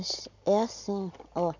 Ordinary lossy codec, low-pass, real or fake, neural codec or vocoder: none; 7.2 kHz; real; none